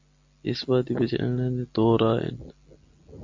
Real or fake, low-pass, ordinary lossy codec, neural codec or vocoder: real; 7.2 kHz; MP3, 48 kbps; none